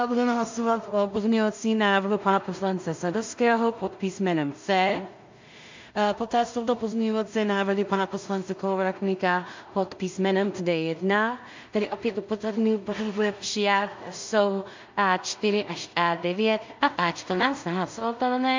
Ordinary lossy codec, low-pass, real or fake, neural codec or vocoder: MP3, 64 kbps; 7.2 kHz; fake; codec, 16 kHz in and 24 kHz out, 0.4 kbps, LongCat-Audio-Codec, two codebook decoder